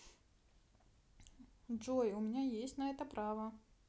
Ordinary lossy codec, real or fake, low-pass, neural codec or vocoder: none; real; none; none